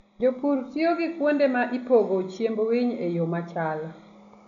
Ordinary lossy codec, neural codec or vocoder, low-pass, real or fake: none; none; 7.2 kHz; real